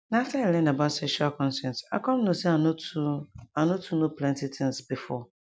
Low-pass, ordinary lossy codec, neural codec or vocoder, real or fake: none; none; none; real